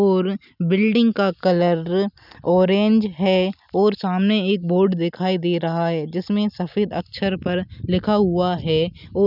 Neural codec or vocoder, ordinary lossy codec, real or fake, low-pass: none; none; real; 5.4 kHz